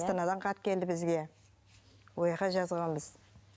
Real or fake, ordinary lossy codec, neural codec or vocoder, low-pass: real; none; none; none